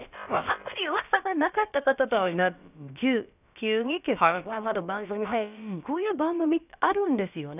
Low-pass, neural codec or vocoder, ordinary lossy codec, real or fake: 3.6 kHz; codec, 16 kHz, about 1 kbps, DyCAST, with the encoder's durations; none; fake